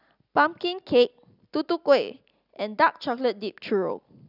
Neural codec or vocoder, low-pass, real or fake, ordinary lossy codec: none; 5.4 kHz; real; none